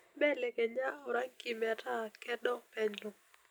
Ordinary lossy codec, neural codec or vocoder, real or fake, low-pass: none; none; real; none